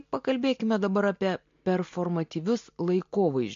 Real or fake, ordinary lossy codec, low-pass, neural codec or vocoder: real; MP3, 48 kbps; 7.2 kHz; none